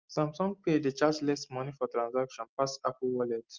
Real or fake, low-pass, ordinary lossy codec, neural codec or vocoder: real; 7.2 kHz; Opus, 32 kbps; none